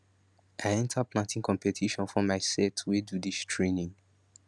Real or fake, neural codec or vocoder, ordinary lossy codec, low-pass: real; none; none; none